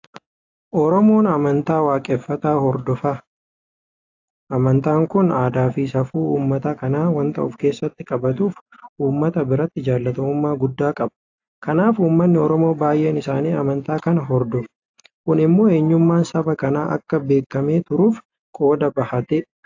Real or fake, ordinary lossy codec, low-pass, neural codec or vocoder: real; AAC, 48 kbps; 7.2 kHz; none